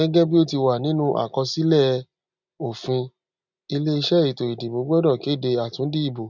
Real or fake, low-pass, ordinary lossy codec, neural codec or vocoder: real; 7.2 kHz; none; none